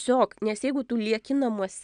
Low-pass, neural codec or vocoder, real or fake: 9.9 kHz; none; real